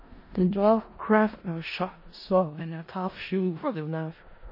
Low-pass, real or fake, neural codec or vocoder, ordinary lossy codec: 5.4 kHz; fake; codec, 16 kHz in and 24 kHz out, 0.4 kbps, LongCat-Audio-Codec, four codebook decoder; MP3, 24 kbps